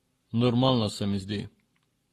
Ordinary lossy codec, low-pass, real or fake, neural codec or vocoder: AAC, 32 kbps; 19.8 kHz; fake; codec, 44.1 kHz, 7.8 kbps, Pupu-Codec